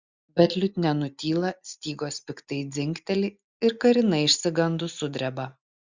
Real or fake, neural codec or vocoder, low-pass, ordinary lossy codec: real; none; 7.2 kHz; Opus, 64 kbps